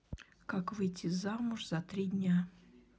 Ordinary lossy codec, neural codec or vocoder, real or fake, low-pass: none; none; real; none